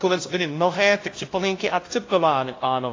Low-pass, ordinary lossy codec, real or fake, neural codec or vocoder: 7.2 kHz; AAC, 32 kbps; fake; codec, 16 kHz, 0.5 kbps, FunCodec, trained on LibriTTS, 25 frames a second